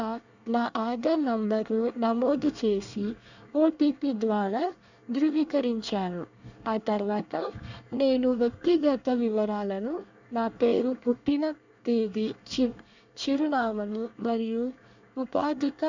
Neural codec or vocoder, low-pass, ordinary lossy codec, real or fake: codec, 24 kHz, 1 kbps, SNAC; 7.2 kHz; none; fake